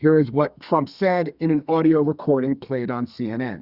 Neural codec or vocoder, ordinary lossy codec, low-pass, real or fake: codec, 32 kHz, 1.9 kbps, SNAC; Opus, 64 kbps; 5.4 kHz; fake